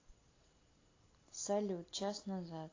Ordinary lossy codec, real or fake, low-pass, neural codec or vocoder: AAC, 32 kbps; real; 7.2 kHz; none